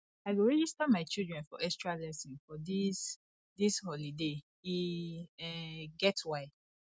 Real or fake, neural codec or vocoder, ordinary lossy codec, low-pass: real; none; none; none